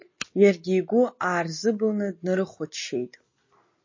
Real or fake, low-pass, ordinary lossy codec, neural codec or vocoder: real; 7.2 kHz; MP3, 32 kbps; none